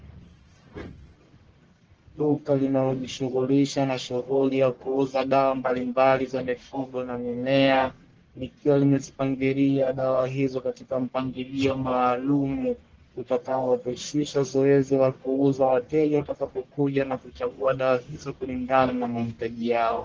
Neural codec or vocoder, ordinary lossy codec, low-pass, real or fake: codec, 44.1 kHz, 1.7 kbps, Pupu-Codec; Opus, 16 kbps; 7.2 kHz; fake